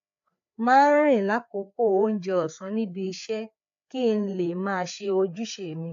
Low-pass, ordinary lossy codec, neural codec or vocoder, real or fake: 7.2 kHz; AAC, 64 kbps; codec, 16 kHz, 4 kbps, FreqCodec, larger model; fake